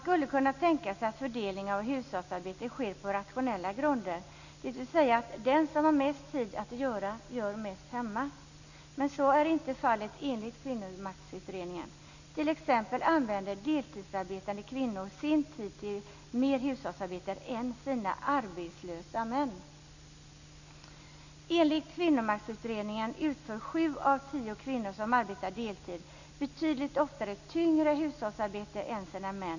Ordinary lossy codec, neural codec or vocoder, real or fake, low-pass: none; none; real; 7.2 kHz